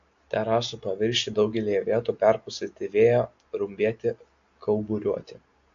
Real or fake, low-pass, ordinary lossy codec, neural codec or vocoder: real; 7.2 kHz; AAC, 48 kbps; none